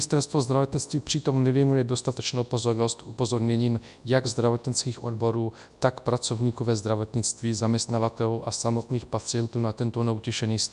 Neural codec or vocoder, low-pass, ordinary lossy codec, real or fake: codec, 24 kHz, 0.9 kbps, WavTokenizer, large speech release; 10.8 kHz; MP3, 96 kbps; fake